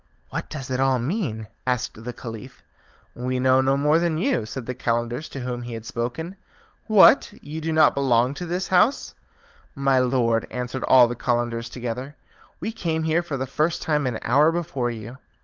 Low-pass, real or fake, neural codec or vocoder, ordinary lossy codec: 7.2 kHz; fake; codec, 16 kHz, 16 kbps, FunCodec, trained on LibriTTS, 50 frames a second; Opus, 24 kbps